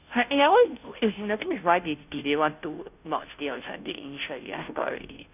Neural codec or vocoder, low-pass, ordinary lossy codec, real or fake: codec, 16 kHz, 0.5 kbps, FunCodec, trained on Chinese and English, 25 frames a second; 3.6 kHz; none; fake